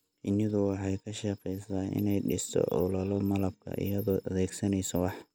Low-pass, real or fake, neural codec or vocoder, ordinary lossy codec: none; real; none; none